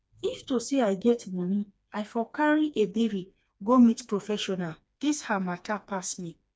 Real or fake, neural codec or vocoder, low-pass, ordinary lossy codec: fake; codec, 16 kHz, 2 kbps, FreqCodec, smaller model; none; none